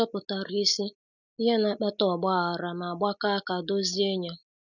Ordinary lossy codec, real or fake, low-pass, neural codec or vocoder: none; real; 7.2 kHz; none